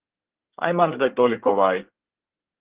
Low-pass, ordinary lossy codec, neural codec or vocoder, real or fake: 3.6 kHz; Opus, 16 kbps; codec, 24 kHz, 1 kbps, SNAC; fake